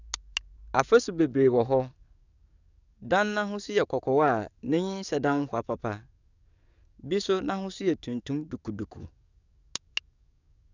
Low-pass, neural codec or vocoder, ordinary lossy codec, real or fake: 7.2 kHz; codec, 44.1 kHz, 7.8 kbps, DAC; none; fake